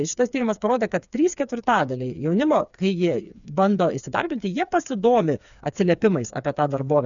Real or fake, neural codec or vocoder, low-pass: fake; codec, 16 kHz, 4 kbps, FreqCodec, smaller model; 7.2 kHz